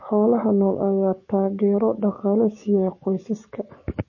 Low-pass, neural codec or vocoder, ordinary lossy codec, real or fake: 7.2 kHz; codec, 44.1 kHz, 7.8 kbps, DAC; MP3, 32 kbps; fake